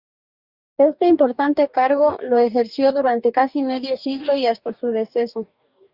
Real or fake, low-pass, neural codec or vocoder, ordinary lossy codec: fake; 5.4 kHz; codec, 44.1 kHz, 2.6 kbps, DAC; Opus, 64 kbps